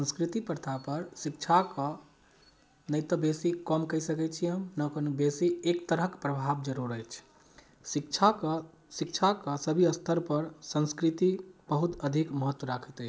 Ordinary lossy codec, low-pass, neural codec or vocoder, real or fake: none; none; none; real